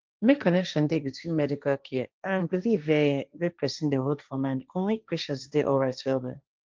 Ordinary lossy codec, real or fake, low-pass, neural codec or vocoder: Opus, 24 kbps; fake; 7.2 kHz; codec, 16 kHz, 1.1 kbps, Voila-Tokenizer